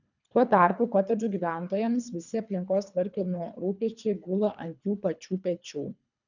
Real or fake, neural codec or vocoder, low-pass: fake; codec, 24 kHz, 3 kbps, HILCodec; 7.2 kHz